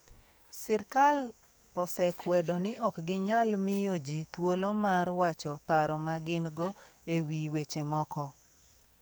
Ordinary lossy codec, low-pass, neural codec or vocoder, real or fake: none; none; codec, 44.1 kHz, 2.6 kbps, SNAC; fake